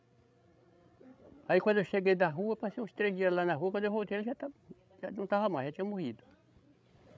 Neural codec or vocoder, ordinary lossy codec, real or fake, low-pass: codec, 16 kHz, 16 kbps, FreqCodec, larger model; none; fake; none